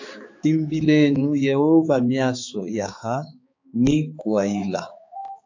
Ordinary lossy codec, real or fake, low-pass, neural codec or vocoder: AAC, 48 kbps; fake; 7.2 kHz; codec, 16 kHz, 4 kbps, X-Codec, HuBERT features, trained on balanced general audio